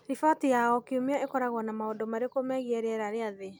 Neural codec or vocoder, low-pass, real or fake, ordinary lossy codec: none; none; real; none